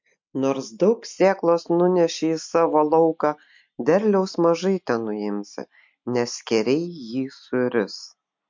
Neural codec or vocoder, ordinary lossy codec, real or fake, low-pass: none; MP3, 48 kbps; real; 7.2 kHz